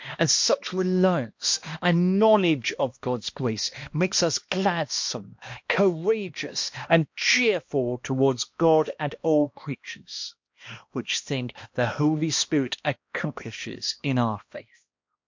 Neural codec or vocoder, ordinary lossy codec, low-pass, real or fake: codec, 16 kHz, 1 kbps, X-Codec, HuBERT features, trained on balanced general audio; MP3, 48 kbps; 7.2 kHz; fake